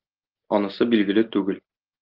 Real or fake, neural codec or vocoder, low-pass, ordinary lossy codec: real; none; 5.4 kHz; Opus, 24 kbps